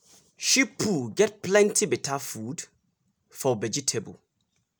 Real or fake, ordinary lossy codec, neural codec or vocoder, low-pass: real; none; none; none